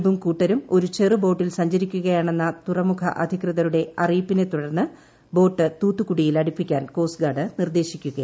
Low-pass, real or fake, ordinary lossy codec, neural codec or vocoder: none; real; none; none